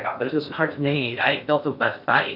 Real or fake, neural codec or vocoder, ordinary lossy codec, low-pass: fake; codec, 16 kHz in and 24 kHz out, 0.6 kbps, FocalCodec, streaming, 4096 codes; MP3, 48 kbps; 5.4 kHz